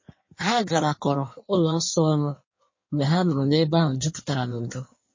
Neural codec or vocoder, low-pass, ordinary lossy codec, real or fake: codec, 16 kHz in and 24 kHz out, 1.1 kbps, FireRedTTS-2 codec; 7.2 kHz; MP3, 32 kbps; fake